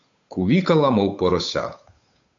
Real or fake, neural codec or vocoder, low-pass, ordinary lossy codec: fake; codec, 16 kHz, 8 kbps, FunCodec, trained on Chinese and English, 25 frames a second; 7.2 kHz; MP3, 64 kbps